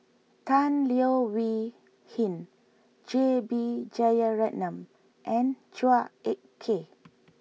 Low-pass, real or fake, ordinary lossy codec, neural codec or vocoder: none; real; none; none